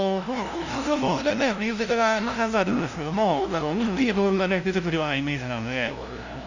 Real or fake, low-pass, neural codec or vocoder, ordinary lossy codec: fake; 7.2 kHz; codec, 16 kHz, 0.5 kbps, FunCodec, trained on LibriTTS, 25 frames a second; none